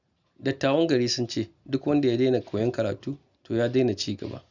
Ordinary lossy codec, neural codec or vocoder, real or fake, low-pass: none; none; real; 7.2 kHz